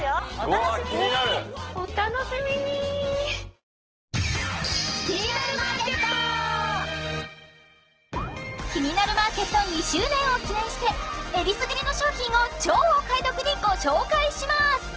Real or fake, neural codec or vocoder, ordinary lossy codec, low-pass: real; none; Opus, 16 kbps; 7.2 kHz